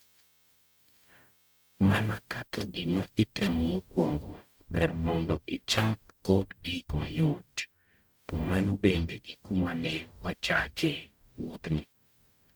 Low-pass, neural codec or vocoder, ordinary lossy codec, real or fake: none; codec, 44.1 kHz, 0.9 kbps, DAC; none; fake